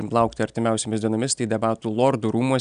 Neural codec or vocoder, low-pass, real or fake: none; 9.9 kHz; real